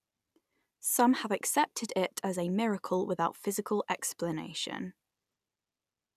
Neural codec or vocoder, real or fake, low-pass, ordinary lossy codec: none; real; 14.4 kHz; none